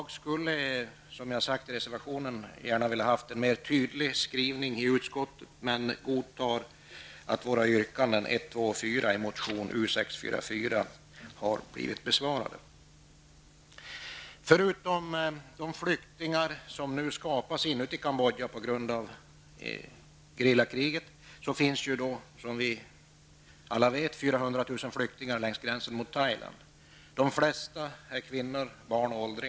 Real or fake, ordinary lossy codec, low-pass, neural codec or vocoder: real; none; none; none